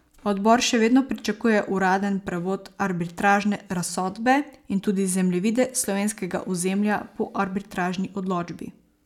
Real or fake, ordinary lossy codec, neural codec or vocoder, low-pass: real; none; none; 19.8 kHz